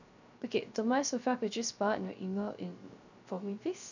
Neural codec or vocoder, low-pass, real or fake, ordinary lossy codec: codec, 16 kHz, 0.3 kbps, FocalCodec; 7.2 kHz; fake; none